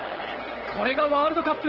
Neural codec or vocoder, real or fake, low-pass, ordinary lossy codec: codec, 16 kHz, 8 kbps, FunCodec, trained on LibriTTS, 25 frames a second; fake; 5.4 kHz; Opus, 16 kbps